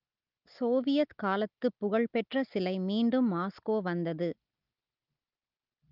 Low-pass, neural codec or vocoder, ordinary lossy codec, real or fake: 5.4 kHz; none; Opus, 24 kbps; real